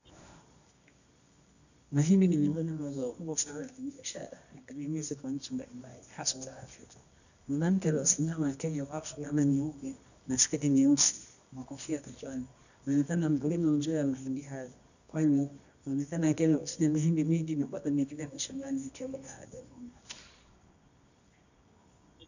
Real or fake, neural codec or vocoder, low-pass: fake; codec, 24 kHz, 0.9 kbps, WavTokenizer, medium music audio release; 7.2 kHz